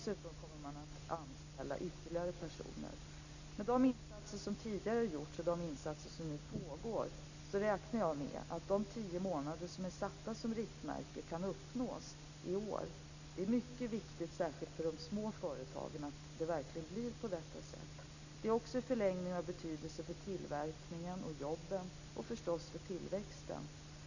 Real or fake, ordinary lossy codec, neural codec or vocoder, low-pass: real; none; none; 7.2 kHz